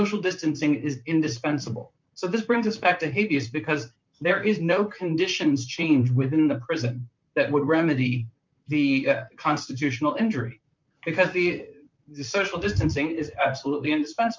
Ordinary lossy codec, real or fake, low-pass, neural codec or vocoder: MP3, 64 kbps; fake; 7.2 kHz; vocoder, 44.1 kHz, 128 mel bands, Pupu-Vocoder